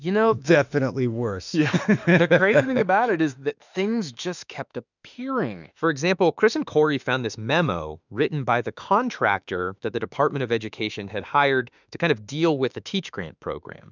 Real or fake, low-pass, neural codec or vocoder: fake; 7.2 kHz; autoencoder, 48 kHz, 32 numbers a frame, DAC-VAE, trained on Japanese speech